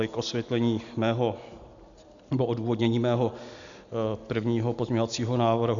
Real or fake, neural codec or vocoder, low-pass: real; none; 7.2 kHz